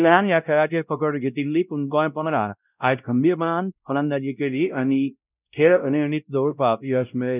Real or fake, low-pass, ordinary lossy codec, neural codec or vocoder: fake; 3.6 kHz; none; codec, 16 kHz, 0.5 kbps, X-Codec, WavLM features, trained on Multilingual LibriSpeech